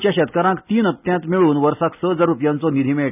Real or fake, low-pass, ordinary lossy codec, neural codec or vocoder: real; 3.6 kHz; none; none